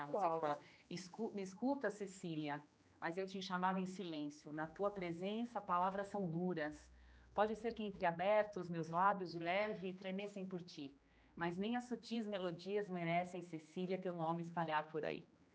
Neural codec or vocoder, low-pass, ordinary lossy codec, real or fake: codec, 16 kHz, 2 kbps, X-Codec, HuBERT features, trained on general audio; none; none; fake